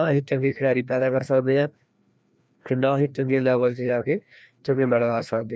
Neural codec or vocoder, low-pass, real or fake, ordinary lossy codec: codec, 16 kHz, 1 kbps, FreqCodec, larger model; none; fake; none